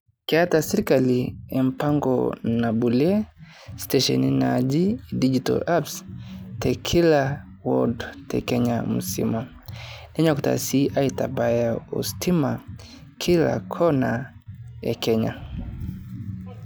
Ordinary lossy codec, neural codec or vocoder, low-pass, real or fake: none; none; none; real